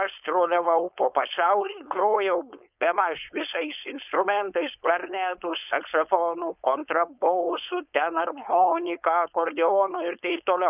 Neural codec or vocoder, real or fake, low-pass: codec, 16 kHz, 4.8 kbps, FACodec; fake; 3.6 kHz